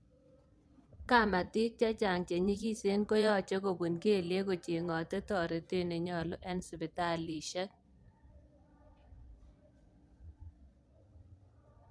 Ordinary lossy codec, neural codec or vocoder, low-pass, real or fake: none; vocoder, 22.05 kHz, 80 mel bands, Vocos; none; fake